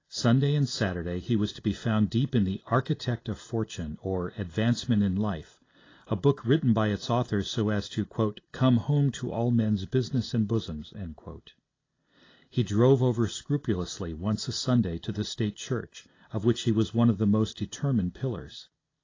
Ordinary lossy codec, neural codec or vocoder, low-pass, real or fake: AAC, 32 kbps; none; 7.2 kHz; real